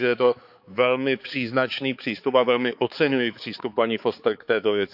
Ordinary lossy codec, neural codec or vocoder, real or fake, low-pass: none; codec, 16 kHz, 4 kbps, X-Codec, HuBERT features, trained on balanced general audio; fake; 5.4 kHz